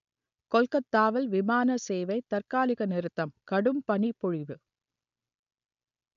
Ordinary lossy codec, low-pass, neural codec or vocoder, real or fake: none; 7.2 kHz; none; real